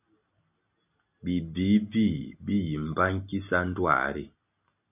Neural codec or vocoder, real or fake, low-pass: none; real; 3.6 kHz